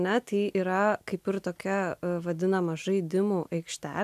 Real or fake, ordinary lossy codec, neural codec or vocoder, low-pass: real; AAC, 96 kbps; none; 14.4 kHz